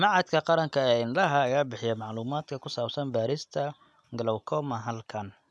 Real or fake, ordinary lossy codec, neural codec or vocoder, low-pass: real; none; none; none